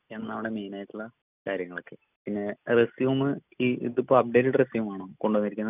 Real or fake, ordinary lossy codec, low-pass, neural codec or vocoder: real; none; 3.6 kHz; none